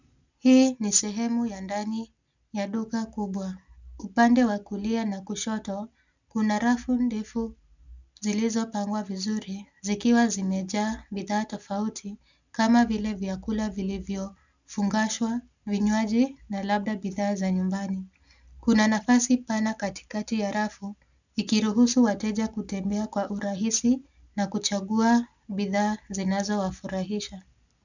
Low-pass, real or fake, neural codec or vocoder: 7.2 kHz; real; none